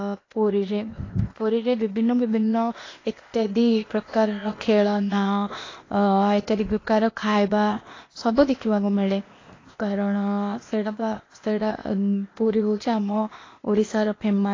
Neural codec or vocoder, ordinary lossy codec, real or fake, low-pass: codec, 16 kHz, 0.8 kbps, ZipCodec; AAC, 32 kbps; fake; 7.2 kHz